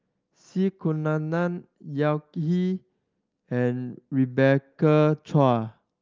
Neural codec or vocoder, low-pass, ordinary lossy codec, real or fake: none; 7.2 kHz; Opus, 24 kbps; real